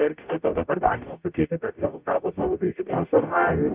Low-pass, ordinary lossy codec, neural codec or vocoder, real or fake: 3.6 kHz; Opus, 24 kbps; codec, 44.1 kHz, 0.9 kbps, DAC; fake